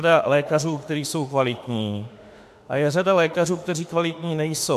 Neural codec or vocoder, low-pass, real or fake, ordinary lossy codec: autoencoder, 48 kHz, 32 numbers a frame, DAC-VAE, trained on Japanese speech; 14.4 kHz; fake; AAC, 96 kbps